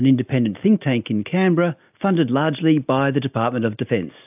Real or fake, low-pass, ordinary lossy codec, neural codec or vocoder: real; 3.6 kHz; AAC, 32 kbps; none